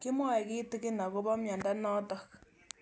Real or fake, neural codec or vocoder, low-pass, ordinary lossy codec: real; none; none; none